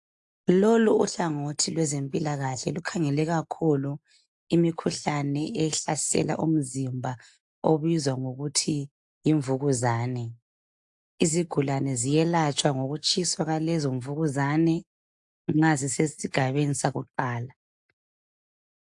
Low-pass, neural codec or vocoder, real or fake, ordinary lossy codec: 10.8 kHz; none; real; AAC, 64 kbps